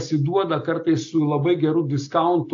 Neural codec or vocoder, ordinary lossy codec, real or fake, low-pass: none; AAC, 48 kbps; real; 7.2 kHz